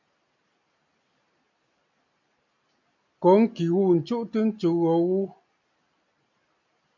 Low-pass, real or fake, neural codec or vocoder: 7.2 kHz; real; none